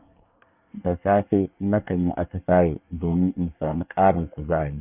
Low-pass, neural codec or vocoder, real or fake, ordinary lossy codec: 3.6 kHz; codec, 24 kHz, 1 kbps, SNAC; fake; none